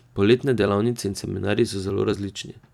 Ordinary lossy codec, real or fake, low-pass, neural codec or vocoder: none; fake; 19.8 kHz; vocoder, 44.1 kHz, 128 mel bands every 256 samples, BigVGAN v2